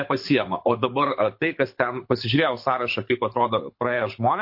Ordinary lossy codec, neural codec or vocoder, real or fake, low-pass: MP3, 32 kbps; codec, 24 kHz, 6 kbps, HILCodec; fake; 5.4 kHz